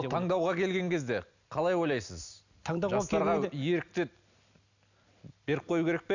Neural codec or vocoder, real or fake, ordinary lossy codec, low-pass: none; real; none; 7.2 kHz